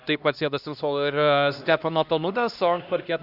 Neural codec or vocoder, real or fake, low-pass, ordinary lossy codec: codec, 16 kHz, 1 kbps, X-Codec, HuBERT features, trained on LibriSpeech; fake; 5.4 kHz; Opus, 64 kbps